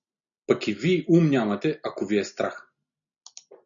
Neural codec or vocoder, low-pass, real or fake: none; 7.2 kHz; real